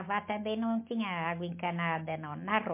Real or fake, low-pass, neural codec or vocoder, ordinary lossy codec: real; 3.6 kHz; none; MP3, 24 kbps